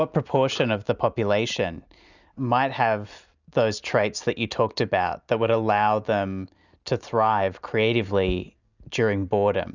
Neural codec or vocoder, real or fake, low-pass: none; real; 7.2 kHz